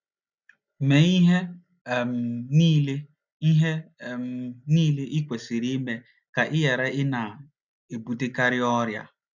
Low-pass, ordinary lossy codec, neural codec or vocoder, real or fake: 7.2 kHz; none; none; real